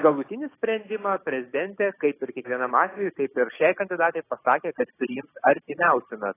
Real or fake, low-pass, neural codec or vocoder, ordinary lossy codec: fake; 3.6 kHz; codec, 16 kHz, 6 kbps, DAC; AAC, 16 kbps